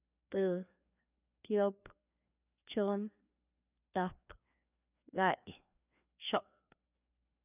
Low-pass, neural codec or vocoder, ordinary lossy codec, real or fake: 3.6 kHz; codec, 16 kHz, 2 kbps, FreqCodec, larger model; none; fake